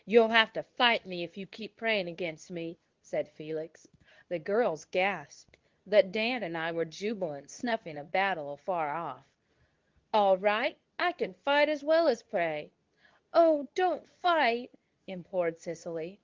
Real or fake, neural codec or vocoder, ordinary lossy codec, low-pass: fake; codec, 24 kHz, 0.9 kbps, WavTokenizer, medium speech release version 2; Opus, 32 kbps; 7.2 kHz